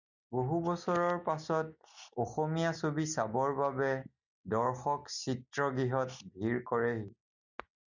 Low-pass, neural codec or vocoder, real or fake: 7.2 kHz; none; real